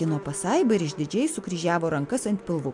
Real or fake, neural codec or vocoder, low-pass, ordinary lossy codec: real; none; 10.8 kHz; MP3, 64 kbps